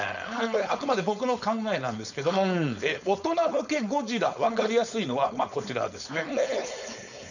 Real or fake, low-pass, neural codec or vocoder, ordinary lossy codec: fake; 7.2 kHz; codec, 16 kHz, 4.8 kbps, FACodec; none